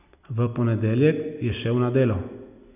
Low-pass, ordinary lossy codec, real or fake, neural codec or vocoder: 3.6 kHz; none; real; none